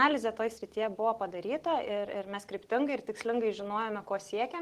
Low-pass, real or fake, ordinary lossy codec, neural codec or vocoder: 14.4 kHz; real; Opus, 16 kbps; none